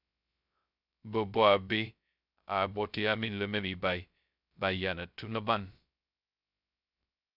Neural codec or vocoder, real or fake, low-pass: codec, 16 kHz, 0.2 kbps, FocalCodec; fake; 5.4 kHz